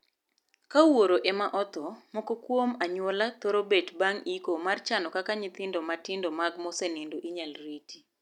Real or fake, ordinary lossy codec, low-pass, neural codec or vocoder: real; none; 19.8 kHz; none